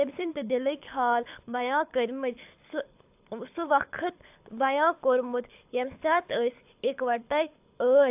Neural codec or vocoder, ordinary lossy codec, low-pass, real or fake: codec, 24 kHz, 6 kbps, HILCodec; AAC, 32 kbps; 3.6 kHz; fake